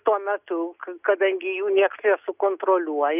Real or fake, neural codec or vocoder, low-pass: real; none; 3.6 kHz